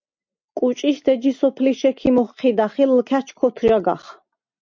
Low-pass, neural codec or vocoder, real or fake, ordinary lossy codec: 7.2 kHz; none; real; MP3, 64 kbps